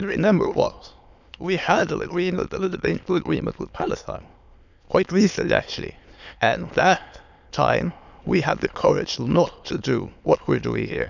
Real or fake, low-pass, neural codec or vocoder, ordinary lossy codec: fake; 7.2 kHz; autoencoder, 22.05 kHz, a latent of 192 numbers a frame, VITS, trained on many speakers; none